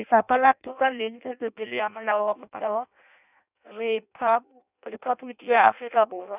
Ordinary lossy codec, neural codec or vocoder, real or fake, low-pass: none; codec, 16 kHz in and 24 kHz out, 0.6 kbps, FireRedTTS-2 codec; fake; 3.6 kHz